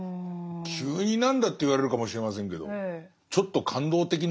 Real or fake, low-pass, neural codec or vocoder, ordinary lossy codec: real; none; none; none